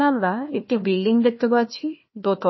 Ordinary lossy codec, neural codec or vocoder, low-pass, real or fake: MP3, 24 kbps; codec, 16 kHz, 1 kbps, FunCodec, trained on Chinese and English, 50 frames a second; 7.2 kHz; fake